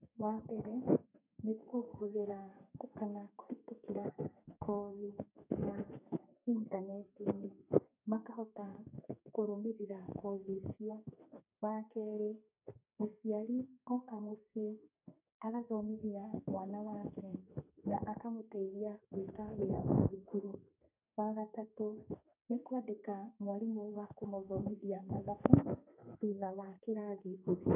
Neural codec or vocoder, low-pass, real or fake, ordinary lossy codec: codec, 32 kHz, 1.9 kbps, SNAC; 3.6 kHz; fake; none